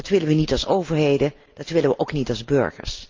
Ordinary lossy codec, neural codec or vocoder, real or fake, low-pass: Opus, 24 kbps; none; real; 7.2 kHz